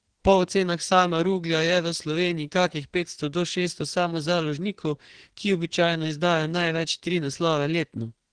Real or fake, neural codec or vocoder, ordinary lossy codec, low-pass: fake; codec, 44.1 kHz, 2.6 kbps, SNAC; Opus, 16 kbps; 9.9 kHz